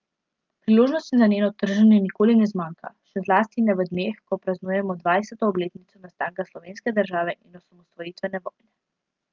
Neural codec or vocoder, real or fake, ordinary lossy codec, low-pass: none; real; Opus, 32 kbps; 7.2 kHz